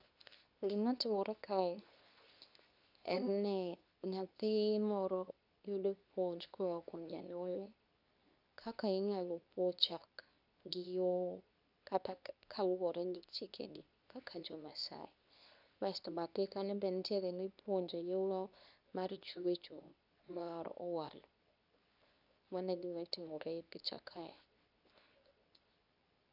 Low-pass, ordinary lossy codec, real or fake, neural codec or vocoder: 5.4 kHz; none; fake; codec, 24 kHz, 0.9 kbps, WavTokenizer, medium speech release version 2